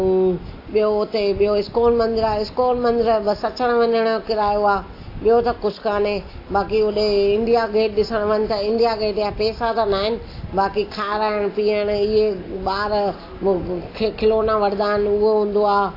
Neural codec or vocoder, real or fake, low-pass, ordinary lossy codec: none; real; 5.4 kHz; AAC, 32 kbps